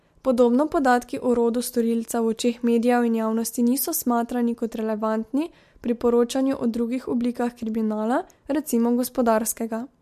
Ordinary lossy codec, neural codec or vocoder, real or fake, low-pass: MP3, 64 kbps; none; real; 14.4 kHz